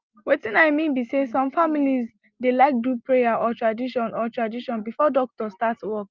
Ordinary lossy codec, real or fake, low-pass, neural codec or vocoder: Opus, 32 kbps; real; 7.2 kHz; none